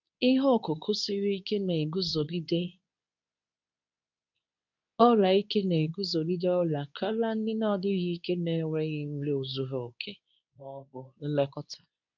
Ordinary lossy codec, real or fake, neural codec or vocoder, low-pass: none; fake; codec, 24 kHz, 0.9 kbps, WavTokenizer, medium speech release version 2; 7.2 kHz